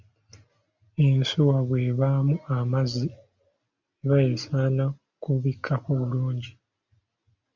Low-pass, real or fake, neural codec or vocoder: 7.2 kHz; real; none